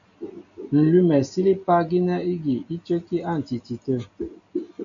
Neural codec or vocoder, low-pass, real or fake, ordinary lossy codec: none; 7.2 kHz; real; MP3, 64 kbps